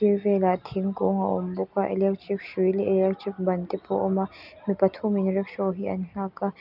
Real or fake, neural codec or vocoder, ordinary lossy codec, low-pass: real; none; none; 5.4 kHz